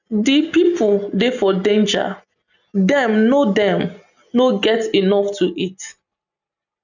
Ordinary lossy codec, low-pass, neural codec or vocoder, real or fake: none; 7.2 kHz; none; real